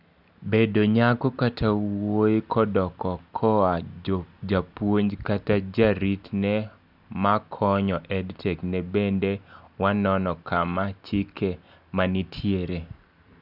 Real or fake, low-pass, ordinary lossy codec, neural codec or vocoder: real; 5.4 kHz; none; none